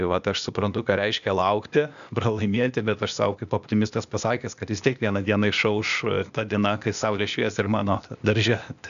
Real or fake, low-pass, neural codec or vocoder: fake; 7.2 kHz; codec, 16 kHz, 0.8 kbps, ZipCodec